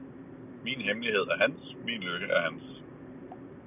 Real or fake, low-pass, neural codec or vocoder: real; 3.6 kHz; none